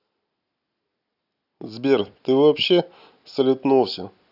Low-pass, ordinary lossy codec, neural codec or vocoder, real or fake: 5.4 kHz; none; none; real